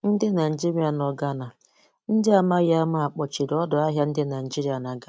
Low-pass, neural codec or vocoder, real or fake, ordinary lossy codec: none; none; real; none